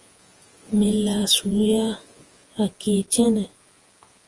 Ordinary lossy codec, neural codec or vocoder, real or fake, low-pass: Opus, 24 kbps; vocoder, 48 kHz, 128 mel bands, Vocos; fake; 10.8 kHz